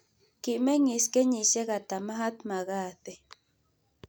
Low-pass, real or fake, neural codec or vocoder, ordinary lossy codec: none; real; none; none